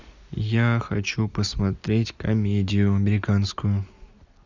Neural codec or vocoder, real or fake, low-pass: none; real; 7.2 kHz